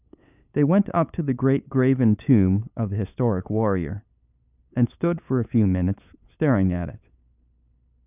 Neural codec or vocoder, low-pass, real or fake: codec, 16 kHz, 8 kbps, FunCodec, trained on LibriTTS, 25 frames a second; 3.6 kHz; fake